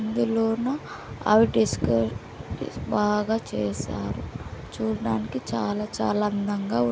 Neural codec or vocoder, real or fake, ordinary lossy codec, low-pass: none; real; none; none